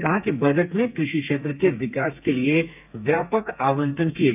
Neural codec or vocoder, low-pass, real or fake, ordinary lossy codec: codec, 32 kHz, 1.9 kbps, SNAC; 3.6 kHz; fake; none